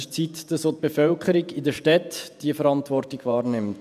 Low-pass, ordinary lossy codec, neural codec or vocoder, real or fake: 14.4 kHz; none; vocoder, 48 kHz, 128 mel bands, Vocos; fake